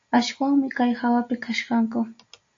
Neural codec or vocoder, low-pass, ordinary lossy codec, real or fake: none; 7.2 kHz; AAC, 48 kbps; real